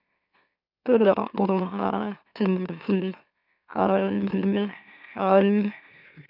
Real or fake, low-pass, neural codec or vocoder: fake; 5.4 kHz; autoencoder, 44.1 kHz, a latent of 192 numbers a frame, MeloTTS